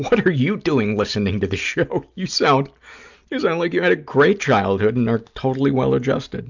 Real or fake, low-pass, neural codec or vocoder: real; 7.2 kHz; none